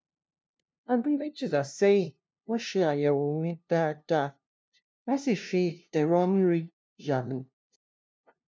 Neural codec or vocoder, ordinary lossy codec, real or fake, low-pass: codec, 16 kHz, 0.5 kbps, FunCodec, trained on LibriTTS, 25 frames a second; none; fake; none